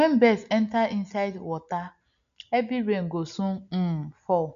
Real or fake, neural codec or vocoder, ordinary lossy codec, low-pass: real; none; none; 7.2 kHz